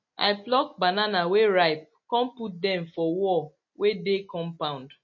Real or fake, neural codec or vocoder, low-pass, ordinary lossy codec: real; none; 7.2 kHz; MP3, 32 kbps